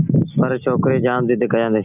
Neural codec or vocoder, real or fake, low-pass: none; real; 3.6 kHz